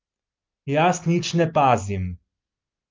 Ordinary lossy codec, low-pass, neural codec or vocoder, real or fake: Opus, 24 kbps; 7.2 kHz; none; real